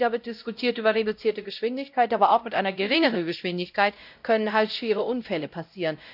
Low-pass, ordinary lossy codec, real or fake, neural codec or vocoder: 5.4 kHz; none; fake; codec, 16 kHz, 0.5 kbps, X-Codec, WavLM features, trained on Multilingual LibriSpeech